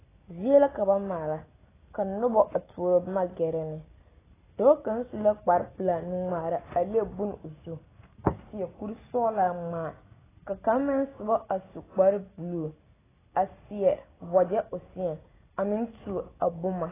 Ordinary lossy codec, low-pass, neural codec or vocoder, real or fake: AAC, 16 kbps; 3.6 kHz; none; real